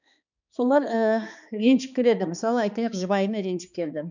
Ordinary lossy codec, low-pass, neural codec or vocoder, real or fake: none; 7.2 kHz; codec, 16 kHz, 2 kbps, X-Codec, HuBERT features, trained on balanced general audio; fake